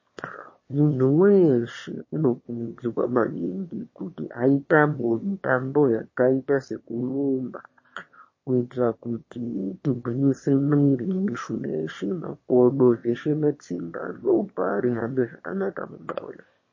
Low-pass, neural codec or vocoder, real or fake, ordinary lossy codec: 7.2 kHz; autoencoder, 22.05 kHz, a latent of 192 numbers a frame, VITS, trained on one speaker; fake; MP3, 32 kbps